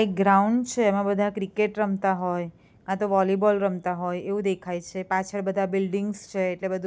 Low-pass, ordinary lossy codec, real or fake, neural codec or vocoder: none; none; real; none